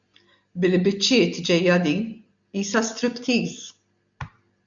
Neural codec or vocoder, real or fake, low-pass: none; real; 7.2 kHz